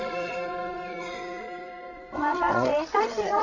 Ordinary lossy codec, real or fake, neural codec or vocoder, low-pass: none; fake; codec, 16 kHz, 16 kbps, FreqCodec, larger model; 7.2 kHz